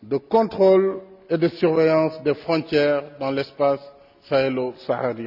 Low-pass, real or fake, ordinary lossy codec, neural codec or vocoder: 5.4 kHz; real; none; none